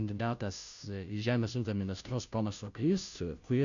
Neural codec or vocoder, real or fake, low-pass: codec, 16 kHz, 0.5 kbps, FunCodec, trained on Chinese and English, 25 frames a second; fake; 7.2 kHz